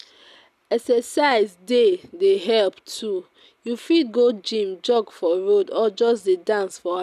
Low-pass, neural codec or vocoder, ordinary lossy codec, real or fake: 14.4 kHz; none; none; real